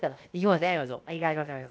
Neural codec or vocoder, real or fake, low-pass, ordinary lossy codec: codec, 16 kHz, 0.7 kbps, FocalCodec; fake; none; none